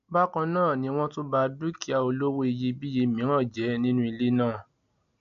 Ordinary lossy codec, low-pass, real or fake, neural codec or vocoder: none; 7.2 kHz; real; none